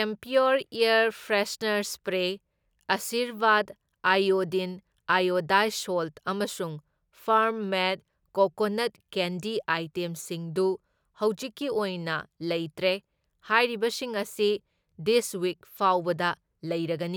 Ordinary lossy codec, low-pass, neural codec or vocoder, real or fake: none; none; none; real